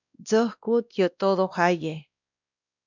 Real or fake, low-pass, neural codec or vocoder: fake; 7.2 kHz; codec, 16 kHz, 1 kbps, X-Codec, WavLM features, trained on Multilingual LibriSpeech